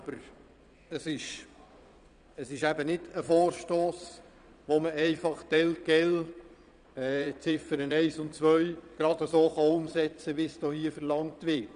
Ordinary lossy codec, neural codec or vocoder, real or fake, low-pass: none; vocoder, 22.05 kHz, 80 mel bands, Vocos; fake; 9.9 kHz